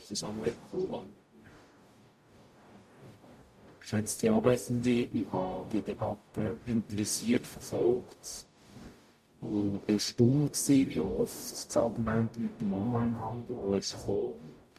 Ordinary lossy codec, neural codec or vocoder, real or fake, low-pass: MP3, 64 kbps; codec, 44.1 kHz, 0.9 kbps, DAC; fake; 14.4 kHz